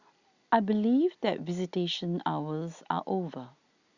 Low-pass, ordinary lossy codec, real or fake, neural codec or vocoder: 7.2 kHz; Opus, 64 kbps; real; none